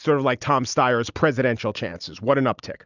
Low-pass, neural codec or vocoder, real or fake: 7.2 kHz; none; real